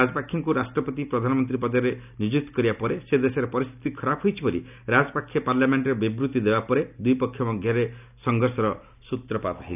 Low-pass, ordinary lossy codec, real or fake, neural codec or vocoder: 3.6 kHz; none; real; none